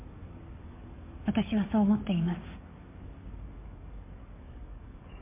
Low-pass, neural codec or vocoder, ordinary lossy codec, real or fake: 3.6 kHz; codec, 44.1 kHz, 7.8 kbps, DAC; MP3, 24 kbps; fake